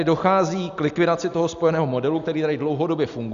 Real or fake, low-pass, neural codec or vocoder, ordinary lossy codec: real; 7.2 kHz; none; Opus, 64 kbps